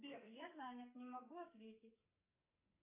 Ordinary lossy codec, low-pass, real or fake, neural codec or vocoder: MP3, 32 kbps; 3.6 kHz; fake; codec, 44.1 kHz, 2.6 kbps, SNAC